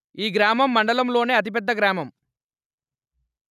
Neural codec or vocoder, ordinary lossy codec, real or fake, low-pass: none; none; real; 14.4 kHz